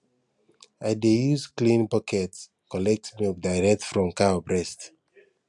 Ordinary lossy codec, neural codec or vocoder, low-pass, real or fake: none; none; 10.8 kHz; real